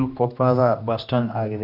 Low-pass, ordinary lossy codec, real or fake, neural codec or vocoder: 5.4 kHz; none; fake; codec, 16 kHz, 1 kbps, X-Codec, HuBERT features, trained on general audio